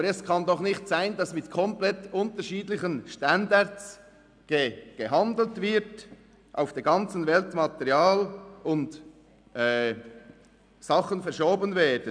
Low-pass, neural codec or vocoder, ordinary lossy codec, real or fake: 9.9 kHz; none; none; real